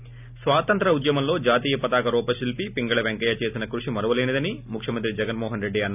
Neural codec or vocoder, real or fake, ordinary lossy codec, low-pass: none; real; none; 3.6 kHz